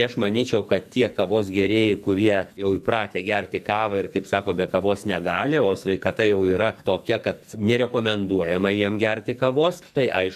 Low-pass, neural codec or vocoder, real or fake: 14.4 kHz; codec, 44.1 kHz, 2.6 kbps, SNAC; fake